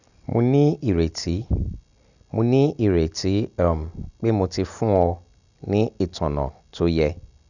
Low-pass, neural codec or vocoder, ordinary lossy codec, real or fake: 7.2 kHz; none; none; real